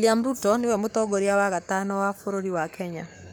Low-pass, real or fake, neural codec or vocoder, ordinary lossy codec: none; fake; codec, 44.1 kHz, 7.8 kbps, Pupu-Codec; none